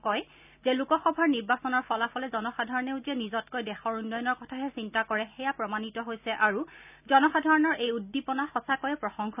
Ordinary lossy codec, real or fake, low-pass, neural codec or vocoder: none; real; 3.6 kHz; none